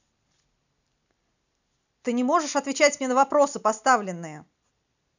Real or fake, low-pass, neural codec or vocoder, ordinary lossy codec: real; 7.2 kHz; none; none